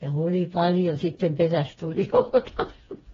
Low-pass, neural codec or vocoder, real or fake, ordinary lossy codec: 7.2 kHz; codec, 16 kHz, 2 kbps, FreqCodec, smaller model; fake; AAC, 24 kbps